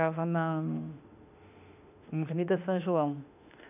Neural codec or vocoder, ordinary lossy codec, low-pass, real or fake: autoencoder, 48 kHz, 32 numbers a frame, DAC-VAE, trained on Japanese speech; none; 3.6 kHz; fake